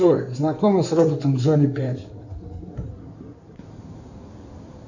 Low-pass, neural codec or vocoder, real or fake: 7.2 kHz; codec, 16 kHz in and 24 kHz out, 2.2 kbps, FireRedTTS-2 codec; fake